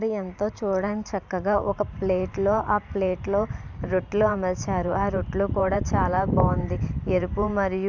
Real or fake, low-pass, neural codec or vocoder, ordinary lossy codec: fake; 7.2 kHz; vocoder, 44.1 kHz, 128 mel bands every 512 samples, BigVGAN v2; none